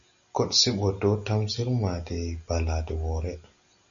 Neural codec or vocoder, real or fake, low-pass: none; real; 7.2 kHz